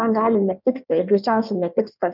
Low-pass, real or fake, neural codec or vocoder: 5.4 kHz; fake; codec, 16 kHz in and 24 kHz out, 1.1 kbps, FireRedTTS-2 codec